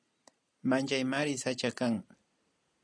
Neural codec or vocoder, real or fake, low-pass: none; real; 9.9 kHz